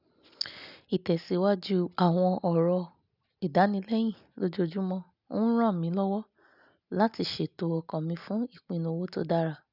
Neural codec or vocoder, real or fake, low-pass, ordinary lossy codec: none; real; 5.4 kHz; none